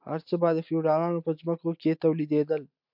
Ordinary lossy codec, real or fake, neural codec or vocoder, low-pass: MP3, 48 kbps; real; none; 5.4 kHz